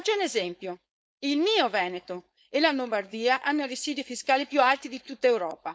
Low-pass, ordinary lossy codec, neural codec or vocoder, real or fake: none; none; codec, 16 kHz, 4.8 kbps, FACodec; fake